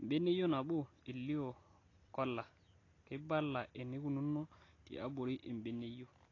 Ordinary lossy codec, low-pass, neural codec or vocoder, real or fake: AAC, 32 kbps; 7.2 kHz; none; real